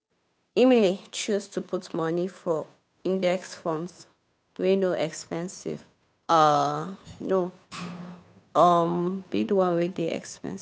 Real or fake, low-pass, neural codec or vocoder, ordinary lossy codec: fake; none; codec, 16 kHz, 2 kbps, FunCodec, trained on Chinese and English, 25 frames a second; none